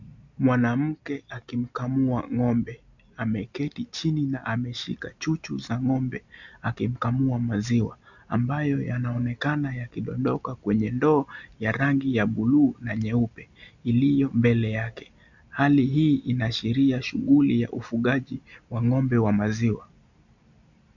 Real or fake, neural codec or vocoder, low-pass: real; none; 7.2 kHz